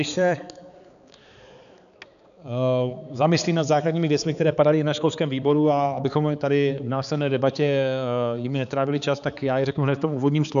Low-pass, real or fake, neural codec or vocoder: 7.2 kHz; fake; codec, 16 kHz, 4 kbps, X-Codec, HuBERT features, trained on balanced general audio